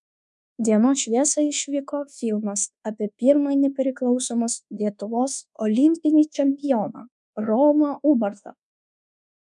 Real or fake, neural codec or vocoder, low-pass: fake; codec, 24 kHz, 1.2 kbps, DualCodec; 10.8 kHz